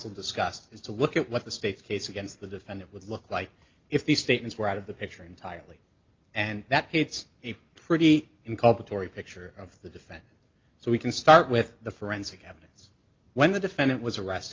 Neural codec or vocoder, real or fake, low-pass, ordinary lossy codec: none; real; 7.2 kHz; Opus, 24 kbps